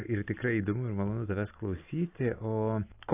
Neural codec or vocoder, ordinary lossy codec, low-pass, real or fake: none; AAC, 24 kbps; 3.6 kHz; real